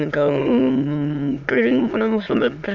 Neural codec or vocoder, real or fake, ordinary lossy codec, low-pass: autoencoder, 22.05 kHz, a latent of 192 numbers a frame, VITS, trained on many speakers; fake; none; 7.2 kHz